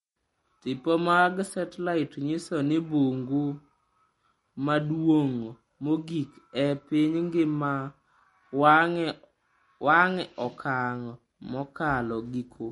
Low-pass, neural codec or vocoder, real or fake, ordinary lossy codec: 19.8 kHz; none; real; MP3, 48 kbps